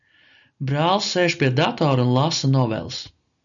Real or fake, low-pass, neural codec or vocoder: real; 7.2 kHz; none